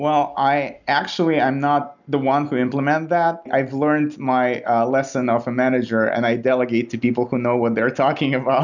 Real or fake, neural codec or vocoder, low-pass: real; none; 7.2 kHz